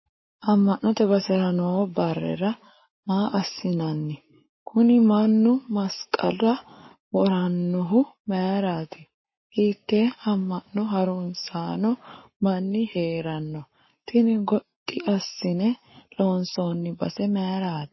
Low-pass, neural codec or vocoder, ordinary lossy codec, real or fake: 7.2 kHz; codec, 44.1 kHz, 7.8 kbps, Pupu-Codec; MP3, 24 kbps; fake